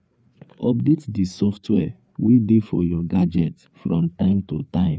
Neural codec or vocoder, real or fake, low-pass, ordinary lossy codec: codec, 16 kHz, 4 kbps, FreqCodec, larger model; fake; none; none